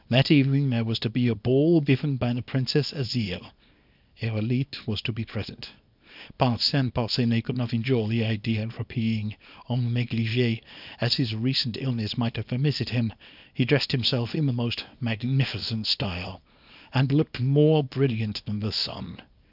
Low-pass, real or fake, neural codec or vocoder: 5.4 kHz; fake; codec, 24 kHz, 0.9 kbps, WavTokenizer, small release